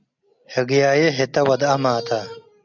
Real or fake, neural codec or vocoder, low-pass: real; none; 7.2 kHz